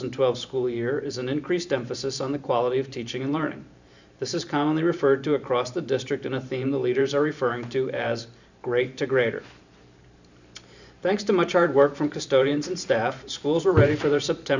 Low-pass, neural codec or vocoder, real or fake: 7.2 kHz; vocoder, 44.1 kHz, 128 mel bands every 512 samples, BigVGAN v2; fake